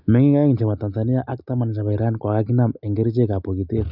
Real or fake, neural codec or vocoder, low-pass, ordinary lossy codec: real; none; 5.4 kHz; none